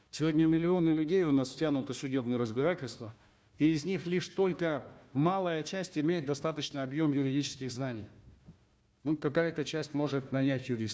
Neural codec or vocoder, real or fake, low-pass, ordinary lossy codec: codec, 16 kHz, 1 kbps, FunCodec, trained on Chinese and English, 50 frames a second; fake; none; none